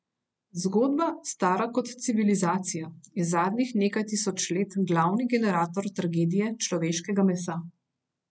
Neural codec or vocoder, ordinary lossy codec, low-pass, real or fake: none; none; none; real